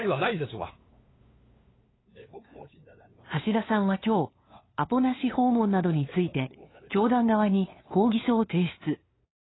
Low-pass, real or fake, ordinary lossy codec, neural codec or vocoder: 7.2 kHz; fake; AAC, 16 kbps; codec, 16 kHz, 2 kbps, FunCodec, trained on LibriTTS, 25 frames a second